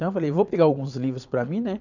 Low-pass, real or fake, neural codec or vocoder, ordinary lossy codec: 7.2 kHz; fake; vocoder, 22.05 kHz, 80 mel bands, Vocos; none